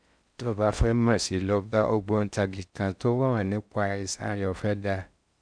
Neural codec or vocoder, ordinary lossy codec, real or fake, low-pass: codec, 16 kHz in and 24 kHz out, 0.6 kbps, FocalCodec, streaming, 4096 codes; none; fake; 9.9 kHz